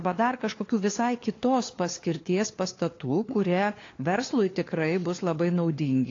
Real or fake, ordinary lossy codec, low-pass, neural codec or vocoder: fake; AAC, 32 kbps; 7.2 kHz; codec, 16 kHz, 4 kbps, FunCodec, trained on LibriTTS, 50 frames a second